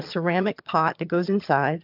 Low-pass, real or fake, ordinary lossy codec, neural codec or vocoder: 5.4 kHz; fake; MP3, 48 kbps; vocoder, 22.05 kHz, 80 mel bands, HiFi-GAN